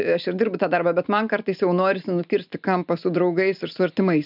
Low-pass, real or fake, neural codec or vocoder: 5.4 kHz; real; none